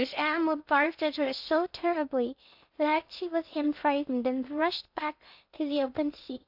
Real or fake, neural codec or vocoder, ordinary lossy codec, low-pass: fake; codec, 16 kHz in and 24 kHz out, 0.6 kbps, FocalCodec, streaming, 2048 codes; AAC, 48 kbps; 5.4 kHz